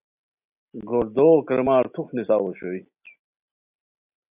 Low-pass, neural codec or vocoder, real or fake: 3.6 kHz; none; real